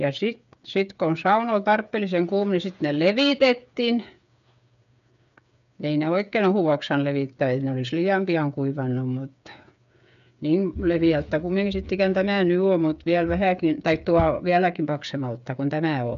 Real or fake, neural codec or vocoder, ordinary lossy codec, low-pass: fake; codec, 16 kHz, 8 kbps, FreqCodec, smaller model; none; 7.2 kHz